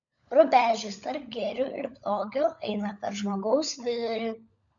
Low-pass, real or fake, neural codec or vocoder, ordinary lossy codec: 7.2 kHz; fake; codec, 16 kHz, 16 kbps, FunCodec, trained on LibriTTS, 50 frames a second; MP3, 96 kbps